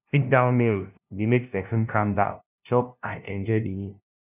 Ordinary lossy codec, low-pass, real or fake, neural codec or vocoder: none; 3.6 kHz; fake; codec, 16 kHz, 0.5 kbps, FunCodec, trained on LibriTTS, 25 frames a second